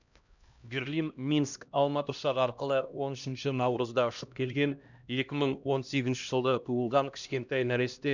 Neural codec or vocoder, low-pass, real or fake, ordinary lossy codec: codec, 16 kHz, 1 kbps, X-Codec, HuBERT features, trained on LibriSpeech; 7.2 kHz; fake; none